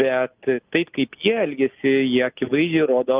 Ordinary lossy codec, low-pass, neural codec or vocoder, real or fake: Opus, 24 kbps; 3.6 kHz; none; real